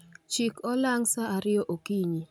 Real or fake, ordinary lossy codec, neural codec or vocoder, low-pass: real; none; none; none